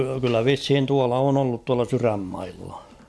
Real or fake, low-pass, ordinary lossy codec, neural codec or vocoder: real; none; none; none